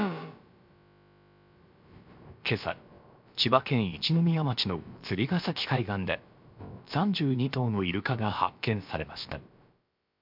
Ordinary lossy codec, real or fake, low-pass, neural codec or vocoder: MP3, 48 kbps; fake; 5.4 kHz; codec, 16 kHz, about 1 kbps, DyCAST, with the encoder's durations